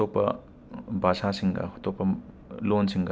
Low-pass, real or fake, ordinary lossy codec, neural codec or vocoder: none; real; none; none